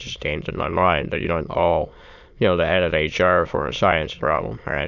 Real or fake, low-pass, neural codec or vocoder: fake; 7.2 kHz; autoencoder, 22.05 kHz, a latent of 192 numbers a frame, VITS, trained on many speakers